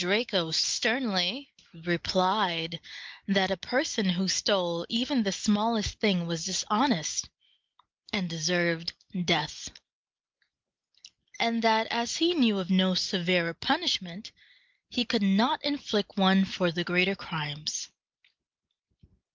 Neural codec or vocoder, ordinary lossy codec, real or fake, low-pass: none; Opus, 24 kbps; real; 7.2 kHz